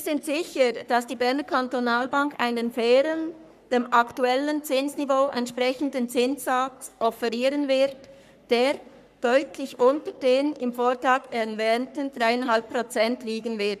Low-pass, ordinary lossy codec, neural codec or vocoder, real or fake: 14.4 kHz; none; codec, 44.1 kHz, 3.4 kbps, Pupu-Codec; fake